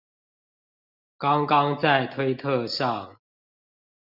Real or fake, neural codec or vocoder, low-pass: real; none; 5.4 kHz